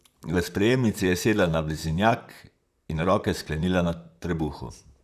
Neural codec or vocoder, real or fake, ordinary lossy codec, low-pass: vocoder, 44.1 kHz, 128 mel bands, Pupu-Vocoder; fake; none; 14.4 kHz